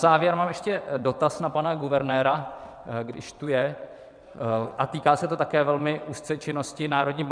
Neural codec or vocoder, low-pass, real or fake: vocoder, 22.05 kHz, 80 mel bands, WaveNeXt; 9.9 kHz; fake